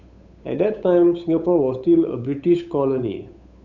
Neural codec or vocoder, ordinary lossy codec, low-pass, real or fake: codec, 16 kHz, 8 kbps, FunCodec, trained on Chinese and English, 25 frames a second; none; 7.2 kHz; fake